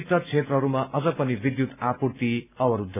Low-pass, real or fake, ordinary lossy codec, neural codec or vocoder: 3.6 kHz; real; none; none